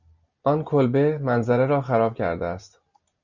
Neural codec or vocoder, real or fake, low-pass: none; real; 7.2 kHz